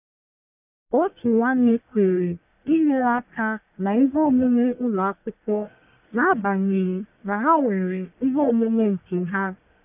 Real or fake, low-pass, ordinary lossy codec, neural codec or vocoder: fake; 3.6 kHz; none; codec, 44.1 kHz, 1.7 kbps, Pupu-Codec